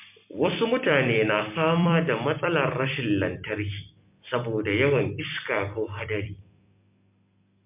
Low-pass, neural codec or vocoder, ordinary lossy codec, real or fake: 3.6 kHz; vocoder, 44.1 kHz, 128 mel bands every 256 samples, BigVGAN v2; MP3, 32 kbps; fake